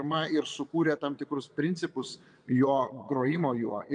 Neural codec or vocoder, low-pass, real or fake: vocoder, 22.05 kHz, 80 mel bands, Vocos; 9.9 kHz; fake